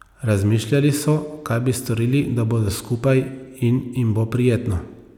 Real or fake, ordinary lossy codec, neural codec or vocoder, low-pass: real; none; none; 19.8 kHz